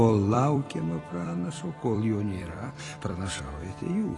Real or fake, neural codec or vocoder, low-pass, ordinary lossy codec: fake; vocoder, 44.1 kHz, 128 mel bands every 256 samples, BigVGAN v2; 10.8 kHz; AAC, 32 kbps